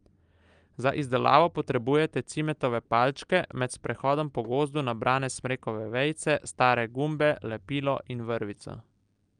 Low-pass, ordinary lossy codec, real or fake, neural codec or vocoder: 9.9 kHz; Opus, 32 kbps; real; none